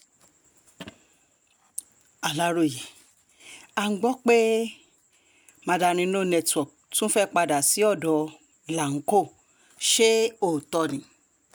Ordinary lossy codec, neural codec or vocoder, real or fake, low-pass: none; none; real; none